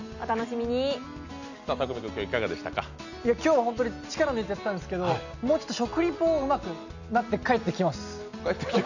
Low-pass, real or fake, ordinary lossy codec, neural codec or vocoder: 7.2 kHz; real; none; none